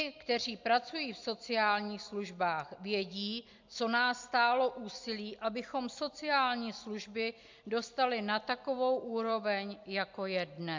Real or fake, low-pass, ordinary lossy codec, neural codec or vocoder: real; 7.2 kHz; MP3, 64 kbps; none